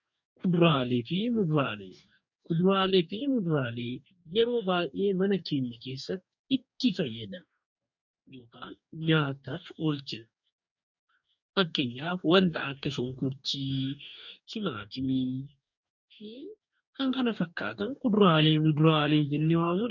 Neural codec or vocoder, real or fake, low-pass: codec, 44.1 kHz, 2.6 kbps, DAC; fake; 7.2 kHz